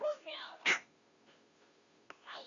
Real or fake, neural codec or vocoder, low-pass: fake; codec, 16 kHz, 0.5 kbps, FunCodec, trained on Chinese and English, 25 frames a second; 7.2 kHz